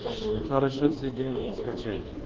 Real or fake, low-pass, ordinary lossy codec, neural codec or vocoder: fake; 7.2 kHz; Opus, 16 kbps; codec, 24 kHz, 1.2 kbps, DualCodec